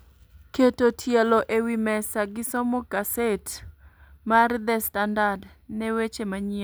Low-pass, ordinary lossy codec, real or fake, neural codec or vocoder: none; none; real; none